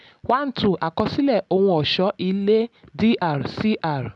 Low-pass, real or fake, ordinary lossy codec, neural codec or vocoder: 10.8 kHz; real; none; none